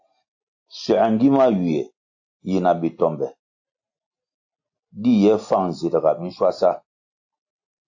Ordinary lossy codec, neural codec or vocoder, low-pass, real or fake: AAC, 48 kbps; none; 7.2 kHz; real